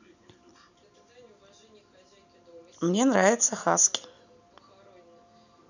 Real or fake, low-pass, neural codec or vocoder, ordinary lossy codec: real; 7.2 kHz; none; none